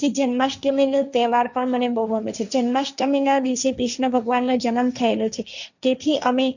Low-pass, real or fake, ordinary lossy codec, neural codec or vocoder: 7.2 kHz; fake; none; codec, 16 kHz, 1.1 kbps, Voila-Tokenizer